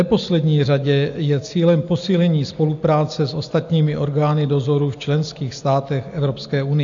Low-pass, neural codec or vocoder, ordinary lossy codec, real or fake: 7.2 kHz; none; AAC, 64 kbps; real